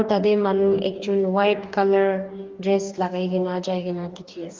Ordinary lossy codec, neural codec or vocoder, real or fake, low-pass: Opus, 32 kbps; codec, 44.1 kHz, 2.6 kbps, DAC; fake; 7.2 kHz